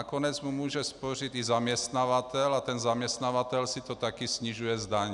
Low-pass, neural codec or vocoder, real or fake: 10.8 kHz; none; real